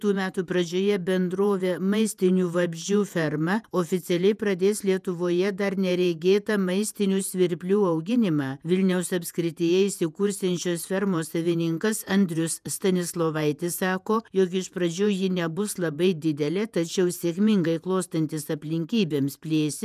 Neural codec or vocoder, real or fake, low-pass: vocoder, 48 kHz, 128 mel bands, Vocos; fake; 14.4 kHz